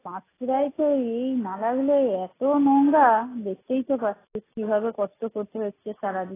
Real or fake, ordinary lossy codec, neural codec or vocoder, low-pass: real; AAC, 16 kbps; none; 3.6 kHz